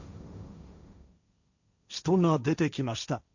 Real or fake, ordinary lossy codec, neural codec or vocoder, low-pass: fake; none; codec, 16 kHz, 1.1 kbps, Voila-Tokenizer; 7.2 kHz